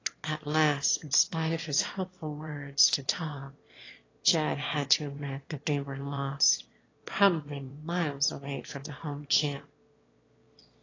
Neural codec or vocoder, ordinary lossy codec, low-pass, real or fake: autoencoder, 22.05 kHz, a latent of 192 numbers a frame, VITS, trained on one speaker; AAC, 32 kbps; 7.2 kHz; fake